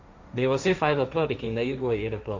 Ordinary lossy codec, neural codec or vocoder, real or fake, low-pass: MP3, 64 kbps; codec, 16 kHz, 1.1 kbps, Voila-Tokenizer; fake; 7.2 kHz